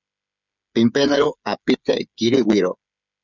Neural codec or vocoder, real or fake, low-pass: codec, 16 kHz, 8 kbps, FreqCodec, smaller model; fake; 7.2 kHz